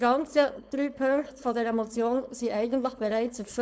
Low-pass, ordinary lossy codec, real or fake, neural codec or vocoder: none; none; fake; codec, 16 kHz, 4.8 kbps, FACodec